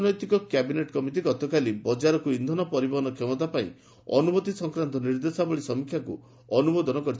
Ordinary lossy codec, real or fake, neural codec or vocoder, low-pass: none; real; none; none